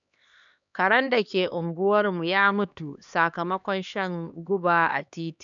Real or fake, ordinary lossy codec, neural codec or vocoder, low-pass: fake; none; codec, 16 kHz, 2 kbps, X-Codec, HuBERT features, trained on LibriSpeech; 7.2 kHz